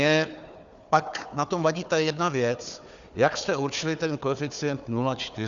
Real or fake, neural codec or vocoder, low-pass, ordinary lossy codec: fake; codec, 16 kHz, 4 kbps, FunCodec, trained on Chinese and English, 50 frames a second; 7.2 kHz; Opus, 64 kbps